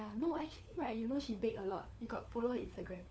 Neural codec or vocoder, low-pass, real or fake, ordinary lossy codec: codec, 16 kHz, 4 kbps, FunCodec, trained on LibriTTS, 50 frames a second; none; fake; none